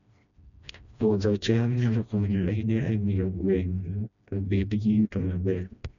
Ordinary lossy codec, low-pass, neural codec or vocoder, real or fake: none; 7.2 kHz; codec, 16 kHz, 1 kbps, FreqCodec, smaller model; fake